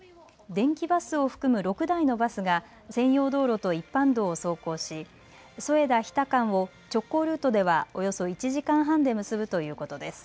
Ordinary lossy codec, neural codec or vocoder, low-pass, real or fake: none; none; none; real